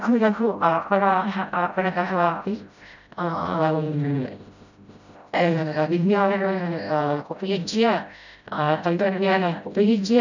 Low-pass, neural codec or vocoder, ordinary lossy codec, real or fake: 7.2 kHz; codec, 16 kHz, 0.5 kbps, FreqCodec, smaller model; none; fake